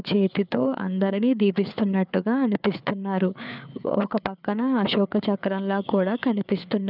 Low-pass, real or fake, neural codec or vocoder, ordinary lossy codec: 5.4 kHz; fake; codec, 16 kHz, 4 kbps, FreqCodec, larger model; none